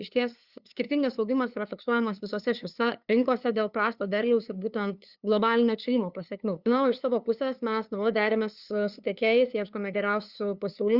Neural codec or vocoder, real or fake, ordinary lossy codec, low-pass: codec, 16 kHz, 2 kbps, FunCodec, trained on LibriTTS, 25 frames a second; fake; Opus, 64 kbps; 5.4 kHz